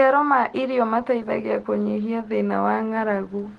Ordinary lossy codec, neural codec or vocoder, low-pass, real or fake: Opus, 16 kbps; none; 10.8 kHz; real